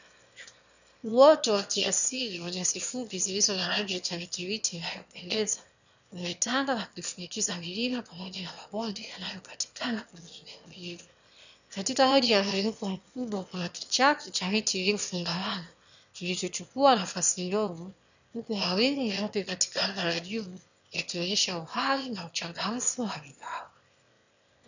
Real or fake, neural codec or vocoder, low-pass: fake; autoencoder, 22.05 kHz, a latent of 192 numbers a frame, VITS, trained on one speaker; 7.2 kHz